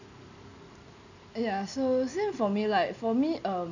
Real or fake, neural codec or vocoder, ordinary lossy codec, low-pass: real; none; none; 7.2 kHz